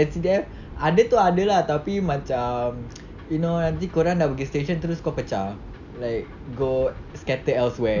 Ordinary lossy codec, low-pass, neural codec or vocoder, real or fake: none; 7.2 kHz; none; real